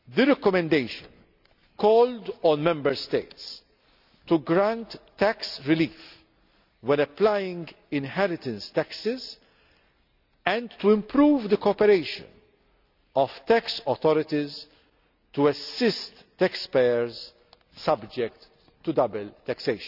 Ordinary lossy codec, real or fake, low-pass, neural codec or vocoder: none; real; 5.4 kHz; none